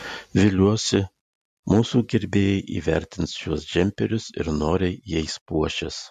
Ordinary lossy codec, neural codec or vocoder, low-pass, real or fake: MP3, 64 kbps; none; 14.4 kHz; real